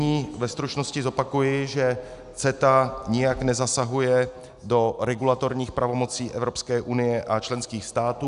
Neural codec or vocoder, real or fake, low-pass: none; real; 10.8 kHz